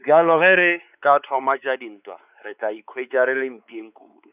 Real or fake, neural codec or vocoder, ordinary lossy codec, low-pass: fake; codec, 16 kHz, 4 kbps, X-Codec, WavLM features, trained on Multilingual LibriSpeech; none; 3.6 kHz